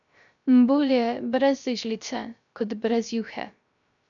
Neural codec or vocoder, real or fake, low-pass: codec, 16 kHz, 0.3 kbps, FocalCodec; fake; 7.2 kHz